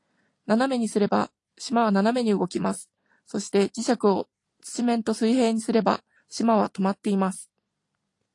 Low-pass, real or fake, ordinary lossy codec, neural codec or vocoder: 10.8 kHz; fake; AAC, 48 kbps; vocoder, 44.1 kHz, 128 mel bands every 256 samples, BigVGAN v2